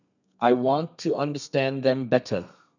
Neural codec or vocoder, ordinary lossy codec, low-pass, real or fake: codec, 32 kHz, 1.9 kbps, SNAC; none; 7.2 kHz; fake